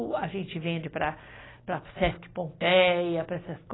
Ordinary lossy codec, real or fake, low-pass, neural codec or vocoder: AAC, 16 kbps; real; 7.2 kHz; none